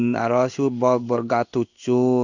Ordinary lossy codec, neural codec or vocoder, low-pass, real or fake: none; codec, 16 kHz in and 24 kHz out, 1 kbps, XY-Tokenizer; 7.2 kHz; fake